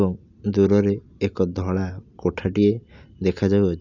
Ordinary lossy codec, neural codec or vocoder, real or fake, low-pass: none; none; real; 7.2 kHz